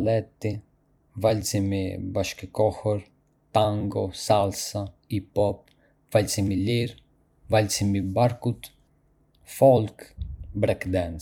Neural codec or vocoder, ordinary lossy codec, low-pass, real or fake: vocoder, 44.1 kHz, 128 mel bands every 256 samples, BigVGAN v2; none; 19.8 kHz; fake